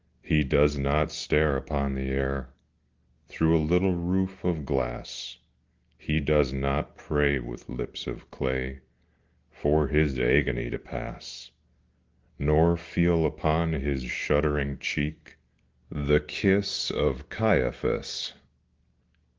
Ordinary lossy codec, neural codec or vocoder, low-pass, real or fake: Opus, 16 kbps; none; 7.2 kHz; real